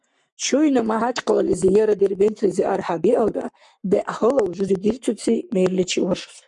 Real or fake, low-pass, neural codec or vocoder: fake; 10.8 kHz; codec, 44.1 kHz, 7.8 kbps, Pupu-Codec